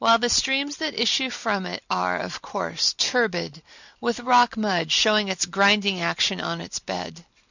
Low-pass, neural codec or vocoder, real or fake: 7.2 kHz; none; real